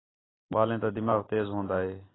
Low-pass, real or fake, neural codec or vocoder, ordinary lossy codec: 7.2 kHz; real; none; AAC, 16 kbps